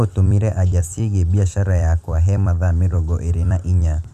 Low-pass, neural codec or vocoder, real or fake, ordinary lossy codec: 14.4 kHz; vocoder, 44.1 kHz, 128 mel bands every 256 samples, BigVGAN v2; fake; none